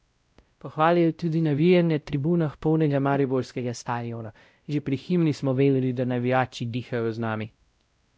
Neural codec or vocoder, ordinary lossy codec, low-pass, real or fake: codec, 16 kHz, 0.5 kbps, X-Codec, WavLM features, trained on Multilingual LibriSpeech; none; none; fake